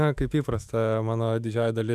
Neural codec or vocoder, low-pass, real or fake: autoencoder, 48 kHz, 128 numbers a frame, DAC-VAE, trained on Japanese speech; 14.4 kHz; fake